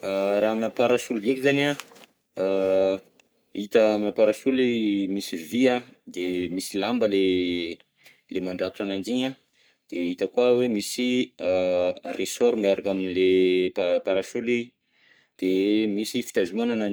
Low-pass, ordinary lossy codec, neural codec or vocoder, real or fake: none; none; codec, 44.1 kHz, 3.4 kbps, Pupu-Codec; fake